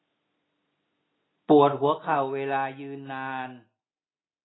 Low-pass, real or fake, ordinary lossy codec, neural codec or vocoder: 7.2 kHz; real; AAC, 16 kbps; none